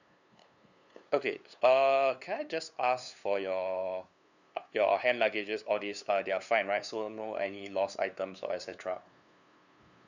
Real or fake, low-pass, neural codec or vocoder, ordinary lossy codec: fake; 7.2 kHz; codec, 16 kHz, 2 kbps, FunCodec, trained on LibriTTS, 25 frames a second; none